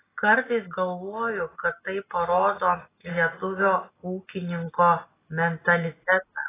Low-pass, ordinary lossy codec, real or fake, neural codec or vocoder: 3.6 kHz; AAC, 16 kbps; real; none